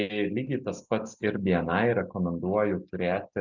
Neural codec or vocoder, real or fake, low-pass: none; real; 7.2 kHz